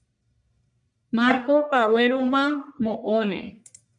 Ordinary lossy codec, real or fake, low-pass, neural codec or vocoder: MP3, 96 kbps; fake; 10.8 kHz; codec, 44.1 kHz, 1.7 kbps, Pupu-Codec